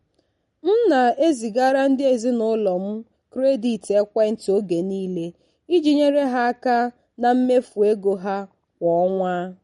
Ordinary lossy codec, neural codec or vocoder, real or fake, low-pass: MP3, 48 kbps; none; real; 19.8 kHz